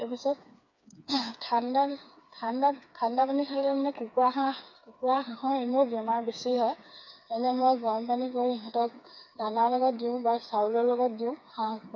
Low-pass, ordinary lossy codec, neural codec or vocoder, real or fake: 7.2 kHz; none; codec, 16 kHz, 4 kbps, FreqCodec, smaller model; fake